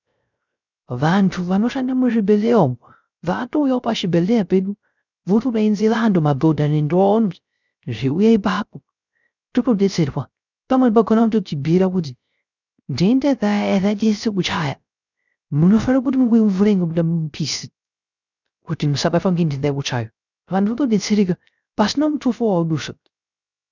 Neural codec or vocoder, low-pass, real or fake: codec, 16 kHz, 0.3 kbps, FocalCodec; 7.2 kHz; fake